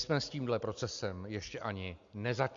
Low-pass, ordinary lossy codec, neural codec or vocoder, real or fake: 7.2 kHz; Opus, 64 kbps; none; real